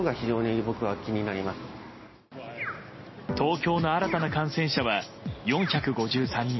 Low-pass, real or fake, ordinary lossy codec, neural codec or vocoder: 7.2 kHz; real; MP3, 24 kbps; none